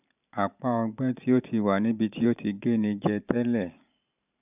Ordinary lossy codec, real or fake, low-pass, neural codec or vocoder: none; fake; 3.6 kHz; vocoder, 44.1 kHz, 128 mel bands every 512 samples, BigVGAN v2